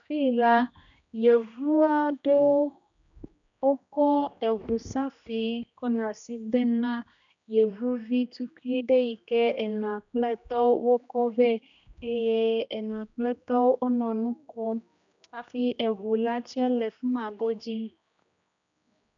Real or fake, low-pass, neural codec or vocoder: fake; 7.2 kHz; codec, 16 kHz, 1 kbps, X-Codec, HuBERT features, trained on general audio